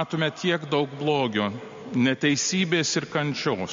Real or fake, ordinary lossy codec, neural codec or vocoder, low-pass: real; MP3, 48 kbps; none; 7.2 kHz